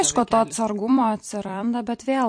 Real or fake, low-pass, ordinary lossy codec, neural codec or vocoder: fake; 9.9 kHz; MP3, 48 kbps; vocoder, 44.1 kHz, 128 mel bands every 256 samples, BigVGAN v2